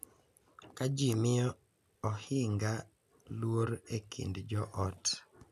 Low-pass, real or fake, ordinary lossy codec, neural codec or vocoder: 14.4 kHz; real; none; none